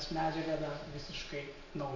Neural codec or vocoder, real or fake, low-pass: none; real; 7.2 kHz